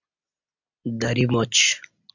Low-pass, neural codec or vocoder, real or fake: 7.2 kHz; none; real